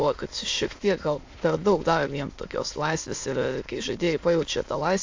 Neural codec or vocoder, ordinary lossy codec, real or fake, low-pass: autoencoder, 22.05 kHz, a latent of 192 numbers a frame, VITS, trained on many speakers; AAC, 48 kbps; fake; 7.2 kHz